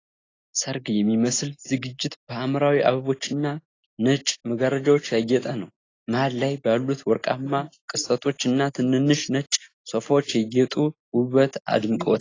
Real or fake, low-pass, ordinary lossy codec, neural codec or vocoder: real; 7.2 kHz; AAC, 32 kbps; none